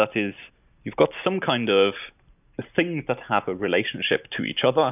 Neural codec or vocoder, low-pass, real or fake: none; 3.6 kHz; real